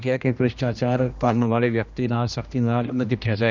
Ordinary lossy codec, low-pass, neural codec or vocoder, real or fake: none; 7.2 kHz; codec, 16 kHz, 1 kbps, X-Codec, HuBERT features, trained on general audio; fake